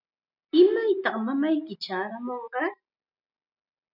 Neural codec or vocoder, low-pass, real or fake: none; 5.4 kHz; real